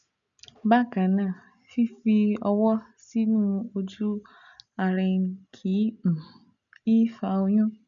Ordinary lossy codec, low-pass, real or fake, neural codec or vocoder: none; 7.2 kHz; real; none